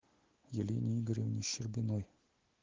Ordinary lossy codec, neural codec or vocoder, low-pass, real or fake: Opus, 16 kbps; none; 7.2 kHz; real